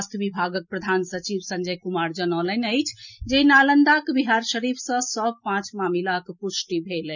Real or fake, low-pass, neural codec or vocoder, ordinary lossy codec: real; 7.2 kHz; none; none